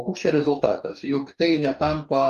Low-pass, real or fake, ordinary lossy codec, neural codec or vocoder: 14.4 kHz; fake; Opus, 32 kbps; codec, 44.1 kHz, 2.6 kbps, DAC